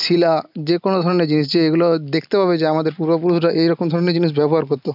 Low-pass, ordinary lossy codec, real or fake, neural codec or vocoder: 5.4 kHz; none; real; none